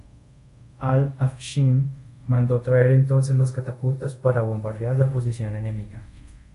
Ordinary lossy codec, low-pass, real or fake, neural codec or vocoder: MP3, 64 kbps; 10.8 kHz; fake; codec, 24 kHz, 0.5 kbps, DualCodec